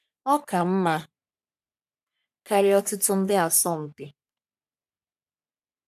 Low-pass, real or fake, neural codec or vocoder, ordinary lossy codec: 14.4 kHz; fake; codec, 44.1 kHz, 3.4 kbps, Pupu-Codec; none